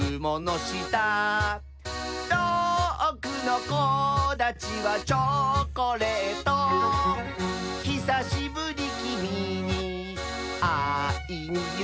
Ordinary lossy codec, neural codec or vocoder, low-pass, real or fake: none; none; none; real